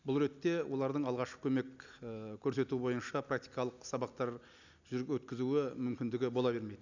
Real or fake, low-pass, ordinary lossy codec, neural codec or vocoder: real; 7.2 kHz; none; none